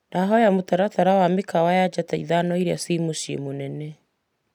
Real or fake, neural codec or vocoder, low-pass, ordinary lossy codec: real; none; 19.8 kHz; none